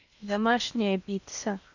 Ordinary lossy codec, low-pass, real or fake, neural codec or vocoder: none; 7.2 kHz; fake; codec, 16 kHz in and 24 kHz out, 0.8 kbps, FocalCodec, streaming, 65536 codes